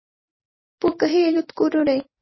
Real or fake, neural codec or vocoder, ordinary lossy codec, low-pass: fake; vocoder, 44.1 kHz, 128 mel bands every 256 samples, BigVGAN v2; MP3, 24 kbps; 7.2 kHz